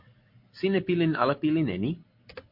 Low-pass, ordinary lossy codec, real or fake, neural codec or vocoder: 5.4 kHz; MP3, 32 kbps; real; none